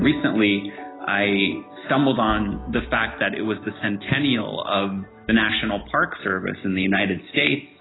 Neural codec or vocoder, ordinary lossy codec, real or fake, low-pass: none; AAC, 16 kbps; real; 7.2 kHz